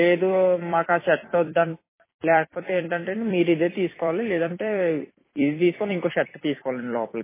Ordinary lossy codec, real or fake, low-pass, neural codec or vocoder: MP3, 16 kbps; fake; 3.6 kHz; vocoder, 44.1 kHz, 128 mel bands every 256 samples, BigVGAN v2